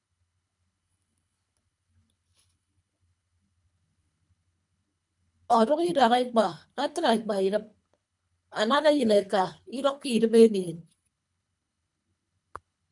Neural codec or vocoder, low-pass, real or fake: codec, 24 kHz, 3 kbps, HILCodec; 10.8 kHz; fake